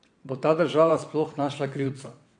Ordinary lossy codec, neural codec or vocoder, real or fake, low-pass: MP3, 64 kbps; vocoder, 22.05 kHz, 80 mel bands, WaveNeXt; fake; 9.9 kHz